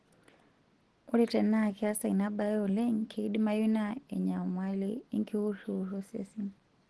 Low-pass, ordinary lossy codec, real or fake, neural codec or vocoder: 10.8 kHz; Opus, 16 kbps; real; none